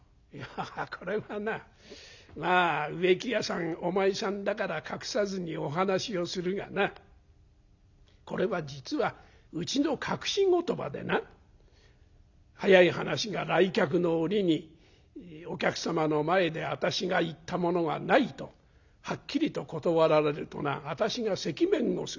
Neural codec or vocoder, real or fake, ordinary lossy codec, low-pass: none; real; none; 7.2 kHz